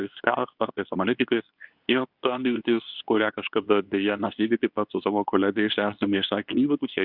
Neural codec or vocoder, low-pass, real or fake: codec, 24 kHz, 0.9 kbps, WavTokenizer, medium speech release version 2; 5.4 kHz; fake